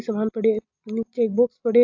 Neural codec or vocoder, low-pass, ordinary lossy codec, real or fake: none; 7.2 kHz; AAC, 48 kbps; real